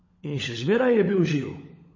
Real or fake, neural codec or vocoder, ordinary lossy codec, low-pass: fake; codec, 16 kHz, 16 kbps, FunCodec, trained on LibriTTS, 50 frames a second; MP3, 32 kbps; 7.2 kHz